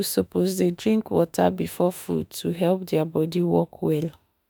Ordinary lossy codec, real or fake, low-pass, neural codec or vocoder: none; fake; none; autoencoder, 48 kHz, 32 numbers a frame, DAC-VAE, trained on Japanese speech